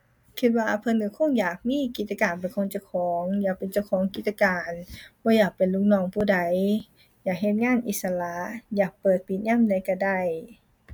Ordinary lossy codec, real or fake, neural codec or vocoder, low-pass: MP3, 96 kbps; real; none; 19.8 kHz